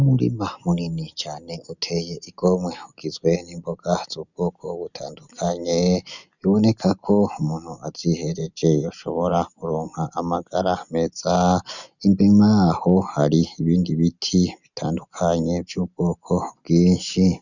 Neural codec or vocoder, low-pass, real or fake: none; 7.2 kHz; real